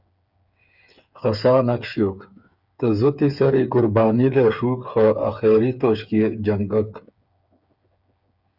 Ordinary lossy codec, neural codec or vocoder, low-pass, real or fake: Opus, 64 kbps; codec, 16 kHz, 8 kbps, FreqCodec, smaller model; 5.4 kHz; fake